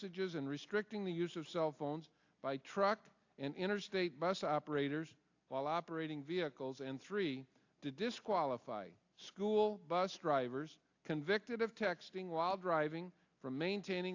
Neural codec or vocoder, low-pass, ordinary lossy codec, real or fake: none; 7.2 kHz; AAC, 48 kbps; real